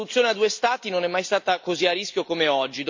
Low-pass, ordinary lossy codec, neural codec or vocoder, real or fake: 7.2 kHz; MP3, 64 kbps; none; real